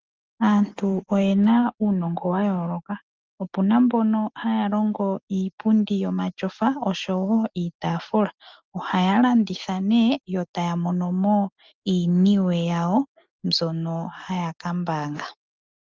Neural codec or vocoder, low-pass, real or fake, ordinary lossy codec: none; 7.2 kHz; real; Opus, 32 kbps